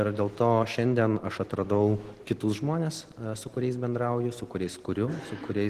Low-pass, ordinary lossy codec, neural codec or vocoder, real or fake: 14.4 kHz; Opus, 16 kbps; none; real